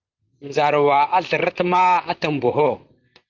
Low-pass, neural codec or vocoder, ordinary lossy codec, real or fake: 7.2 kHz; vocoder, 44.1 kHz, 128 mel bands, Pupu-Vocoder; Opus, 32 kbps; fake